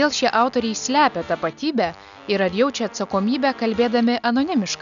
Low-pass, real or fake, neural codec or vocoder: 7.2 kHz; real; none